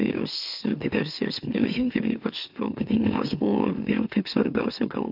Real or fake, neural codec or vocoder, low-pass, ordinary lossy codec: fake; autoencoder, 44.1 kHz, a latent of 192 numbers a frame, MeloTTS; 5.4 kHz; AAC, 48 kbps